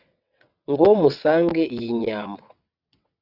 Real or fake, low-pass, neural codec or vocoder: fake; 5.4 kHz; vocoder, 22.05 kHz, 80 mel bands, WaveNeXt